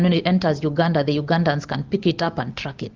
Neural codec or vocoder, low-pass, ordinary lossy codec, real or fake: none; 7.2 kHz; Opus, 24 kbps; real